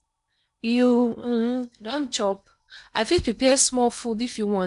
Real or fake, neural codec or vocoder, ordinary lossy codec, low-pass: fake; codec, 16 kHz in and 24 kHz out, 0.8 kbps, FocalCodec, streaming, 65536 codes; none; 10.8 kHz